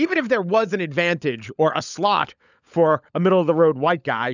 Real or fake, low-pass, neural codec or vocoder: fake; 7.2 kHz; codec, 16 kHz, 16 kbps, FunCodec, trained on LibriTTS, 50 frames a second